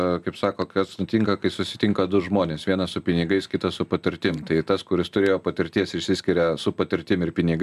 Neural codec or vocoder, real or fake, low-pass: none; real; 14.4 kHz